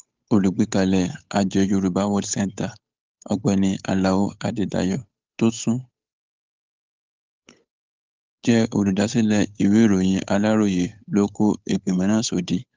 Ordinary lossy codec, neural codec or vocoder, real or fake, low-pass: Opus, 32 kbps; codec, 16 kHz, 8 kbps, FunCodec, trained on Chinese and English, 25 frames a second; fake; 7.2 kHz